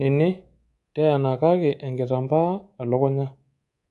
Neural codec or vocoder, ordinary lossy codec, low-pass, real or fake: codec, 24 kHz, 3.1 kbps, DualCodec; AAC, 64 kbps; 10.8 kHz; fake